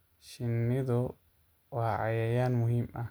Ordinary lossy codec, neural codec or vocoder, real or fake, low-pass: none; none; real; none